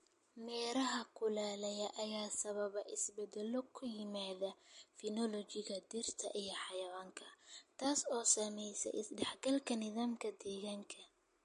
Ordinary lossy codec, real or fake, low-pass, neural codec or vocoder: MP3, 48 kbps; real; 14.4 kHz; none